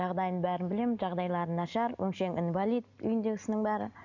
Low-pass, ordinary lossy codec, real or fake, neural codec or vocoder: 7.2 kHz; none; real; none